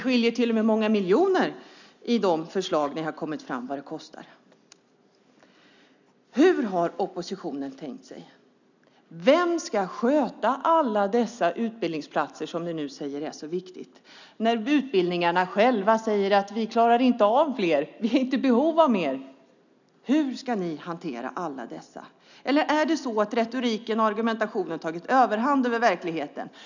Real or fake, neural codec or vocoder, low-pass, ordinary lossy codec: real; none; 7.2 kHz; none